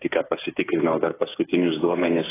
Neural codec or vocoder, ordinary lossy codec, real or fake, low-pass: codec, 16 kHz, 8 kbps, FreqCodec, smaller model; AAC, 16 kbps; fake; 3.6 kHz